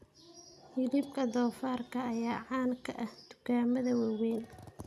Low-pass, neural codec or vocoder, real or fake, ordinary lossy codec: 14.4 kHz; none; real; none